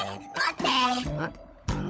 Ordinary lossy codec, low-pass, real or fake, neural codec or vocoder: none; none; fake; codec, 16 kHz, 16 kbps, FunCodec, trained on LibriTTS, 50 frames a second